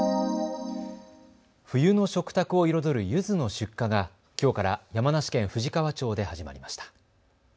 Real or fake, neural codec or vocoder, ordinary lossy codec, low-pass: real; none; none; none